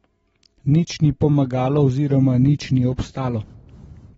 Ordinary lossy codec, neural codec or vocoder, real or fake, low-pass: AAC, 24 kbps; none; real; 19.8 kHz